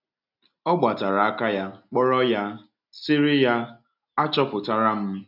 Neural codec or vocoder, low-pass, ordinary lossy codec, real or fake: none; 5.4 kHz; none; real